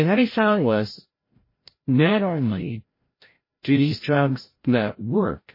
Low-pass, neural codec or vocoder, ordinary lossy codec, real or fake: 5.4 kHz; codec, 16 kHz, 0.5 kbps, FreqCodec, larger model; MP3, 24 kbps; fake